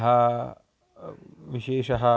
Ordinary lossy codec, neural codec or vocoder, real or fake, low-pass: none; none; real; none